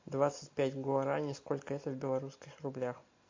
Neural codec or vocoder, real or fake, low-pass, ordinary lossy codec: none; real; 7.2 kHz; MP3, 48 kbps